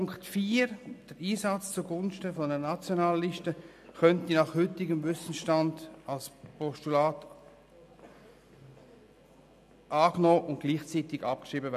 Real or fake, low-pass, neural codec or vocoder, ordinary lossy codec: real; 14.4 kHz; none; none